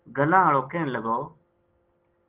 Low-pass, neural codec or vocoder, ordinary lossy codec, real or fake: 3.6 kHz; none; Opus, 16 kbps; real